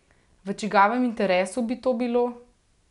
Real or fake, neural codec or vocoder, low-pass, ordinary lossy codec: real; none; 10.8 kHz; none